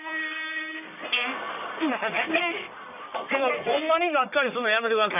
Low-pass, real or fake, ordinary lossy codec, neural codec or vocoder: 3.6 kHz; fake; none; codec, 44.1 kHz, 1.7 kbps, Pupu-Codec